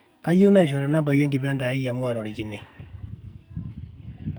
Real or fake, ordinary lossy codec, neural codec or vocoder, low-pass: fake; none; codec, 44.1 kHz, 2.6 kbps, SNAC; none